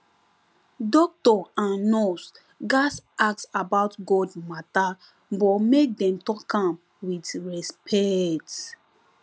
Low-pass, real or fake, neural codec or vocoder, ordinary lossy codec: none; real; none; none